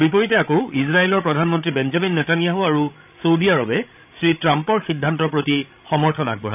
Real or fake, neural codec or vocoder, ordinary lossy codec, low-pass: fake; codec, 16 kHz, 16 kbps, FreqCodec, larger model; none; 3.6 kHz